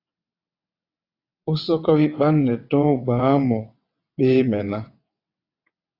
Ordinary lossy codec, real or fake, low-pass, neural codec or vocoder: AAC, 32 kbps; fake; 5.4 kHz; vocoder, 22.05 kHz, 80 mel bands, WaveNeXt